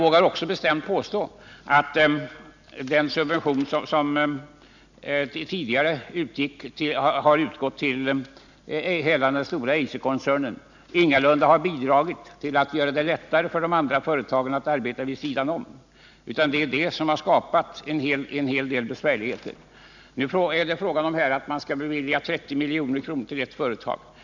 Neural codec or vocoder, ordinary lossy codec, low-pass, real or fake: none; none; 7.2 kHz; real